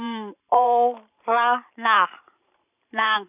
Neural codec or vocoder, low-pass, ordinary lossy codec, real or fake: vocoder, 44.1 kHz, 128 mel bands every 512 samples, BigVGAN v2; 3.6 kHz; none; fake